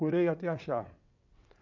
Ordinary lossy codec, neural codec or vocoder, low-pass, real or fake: none; vocoder, 22.05 kHz, 80 mel bands, WaveNeXt; 7.2 kHz; fake